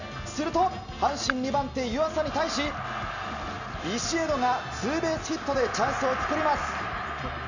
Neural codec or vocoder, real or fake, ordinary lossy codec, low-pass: none; real; none; 7.2 kHz